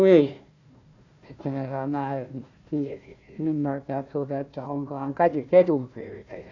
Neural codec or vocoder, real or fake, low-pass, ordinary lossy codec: codec, 16 kHz, 1 kbps, FunCodec, trained on Chinese and English, 50 frames a second; fake; 7.2 kHz; none